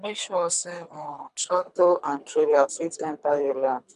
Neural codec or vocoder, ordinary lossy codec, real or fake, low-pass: codec, 24 kHz, 3 kbps, HILCodec; none; fake; 10.8 kHz